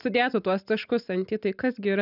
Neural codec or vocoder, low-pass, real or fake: none; 5.4 kHz; real